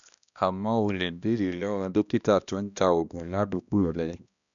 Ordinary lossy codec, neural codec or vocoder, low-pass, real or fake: none; codec, 16 kHz, 1 kbps, X-Codec, HuBERT features, trained on balanced general audio; 7.2 kHz; fake